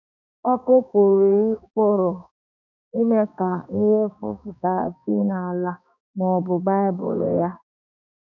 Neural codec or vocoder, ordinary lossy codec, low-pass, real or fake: codec, 16 kHz, 2 kbps, X-Codec, HuBERT features, trained on balanced general audio; none; 7.2 kHz; fake